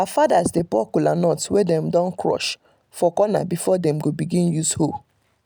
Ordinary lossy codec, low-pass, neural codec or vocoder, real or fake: none; none; none; real